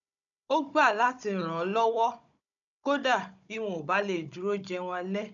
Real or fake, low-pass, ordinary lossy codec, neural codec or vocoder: fake; 7.2 kHz; none; codec, 16 kHz, 16 kbps, FunCodec, trained on Chinese and English, 50 frames a second